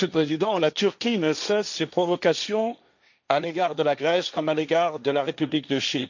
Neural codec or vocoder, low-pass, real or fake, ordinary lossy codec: codec, 16 kHz, 1.1 kbps, Voila-Tokenizer; 7.2 kHz; fake; none